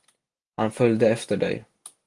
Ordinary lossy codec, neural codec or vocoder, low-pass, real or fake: Opus, 24 kbps; none; 10.8 kHz; real